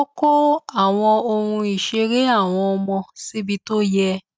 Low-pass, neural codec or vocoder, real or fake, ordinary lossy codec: none; none; real; none